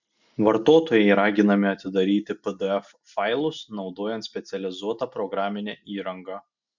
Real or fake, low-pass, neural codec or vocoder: real; 7.2 kHz; none